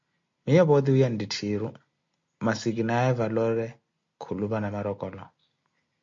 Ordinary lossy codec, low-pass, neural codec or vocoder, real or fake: MP3, 48 kbps; 7.2 kHz; none; real